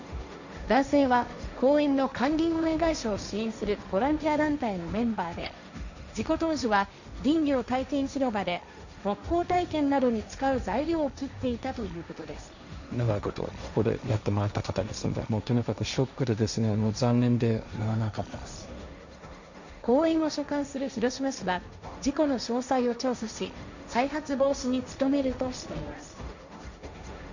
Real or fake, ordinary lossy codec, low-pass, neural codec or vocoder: fake; none; 7.2 kHz; codec, 16 kHz, 1.1 kbps, Voila-Tokenizer